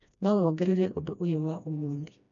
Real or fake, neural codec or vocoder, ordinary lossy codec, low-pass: fake; codec, 16 kHz, 1 kbps, FreqCodec, smaller model; none; 7.2 kHz